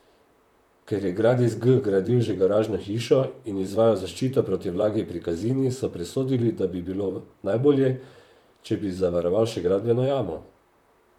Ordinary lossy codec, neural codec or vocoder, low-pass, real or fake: none; vocoder, 44.1 kHz, 128 mel bands, Pupu-Vocoder; 19.8 kHz; fake